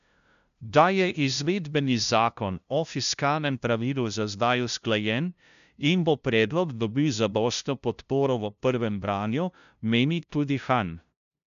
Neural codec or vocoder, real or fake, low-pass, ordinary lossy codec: codec, 16 kHz, 0.5 kbps, FunCodec, trained on LibriTTS, 25 frames a second; fake; 7.2 kHz; none